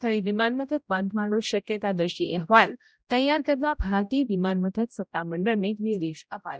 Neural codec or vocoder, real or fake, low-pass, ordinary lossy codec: codec, 16 kHz, 0.5 kbps, X-Codec, HuBERT features, trained on general audio; fake; none; none